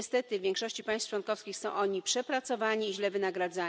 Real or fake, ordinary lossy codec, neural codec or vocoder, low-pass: real; none; none; none